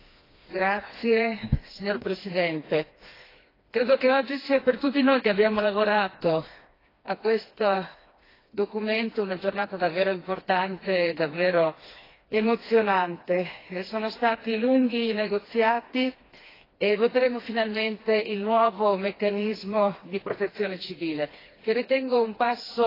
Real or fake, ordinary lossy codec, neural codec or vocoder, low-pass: fake; AAC, 24 kbps; codec, 16 kHz, 2 kbps, FreqCodec, smaller model; 5.4 kHz